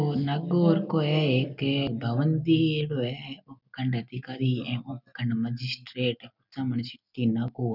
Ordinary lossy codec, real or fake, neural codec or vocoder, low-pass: none; real; none; 5.4 kHz